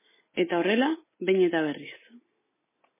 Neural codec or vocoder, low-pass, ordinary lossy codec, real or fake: none; 3.6 kHz; MP3, 16 kbps; real